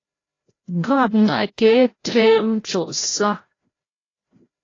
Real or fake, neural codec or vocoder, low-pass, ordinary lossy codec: fake; codec, 16 kHz, 0.5 kbps, FreqCodec, larger model; 7.2 kHz; AAC, 32 kbps